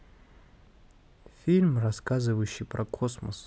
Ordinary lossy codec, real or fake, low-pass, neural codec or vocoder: none; real; none; none